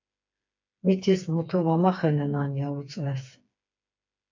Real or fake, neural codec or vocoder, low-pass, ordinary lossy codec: fake; codec, 16 kHz, 4 kbps, FreqCodec, smaller model; 7.2 kHz; MP3, 64 kbps